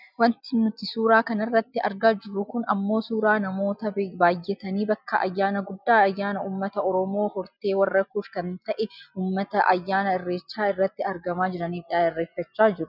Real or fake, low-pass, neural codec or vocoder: real; 5.4 kHz; none